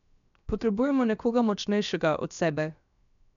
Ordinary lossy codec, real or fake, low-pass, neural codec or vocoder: none; fake; 7.2 kHz; codec, 16 kHz, 0.7 kbps, FocalCodec